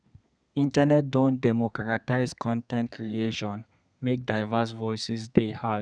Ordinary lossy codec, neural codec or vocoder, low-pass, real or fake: none; codec, 32 kHz, 1.9 kbps, SNAC; 9.9 kHz; fake